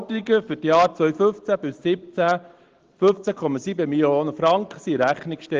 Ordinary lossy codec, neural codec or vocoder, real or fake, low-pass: Opus, 16 kbps; none; real; 7.2 kHz